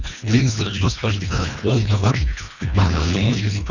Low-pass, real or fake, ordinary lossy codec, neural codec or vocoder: 7.2 kHz; fake; none; codec, 24 kHz, 1.5 kbps, HILCodec